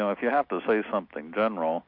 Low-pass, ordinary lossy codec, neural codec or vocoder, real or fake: 5.4 kHz; MP3, 48 kbps; none; real